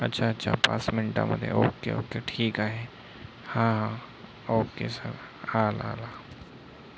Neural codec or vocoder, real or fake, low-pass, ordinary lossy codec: none; real; none; none